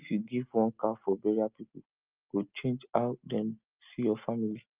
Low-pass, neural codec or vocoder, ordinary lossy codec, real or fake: 3.6 kHz; none; Opus, 24 kbps; real